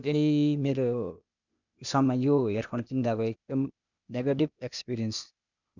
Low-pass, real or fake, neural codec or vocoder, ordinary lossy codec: 7.2 kHz; fake; codec, 16 kHz, 0.8 kbps, ZipCodec; none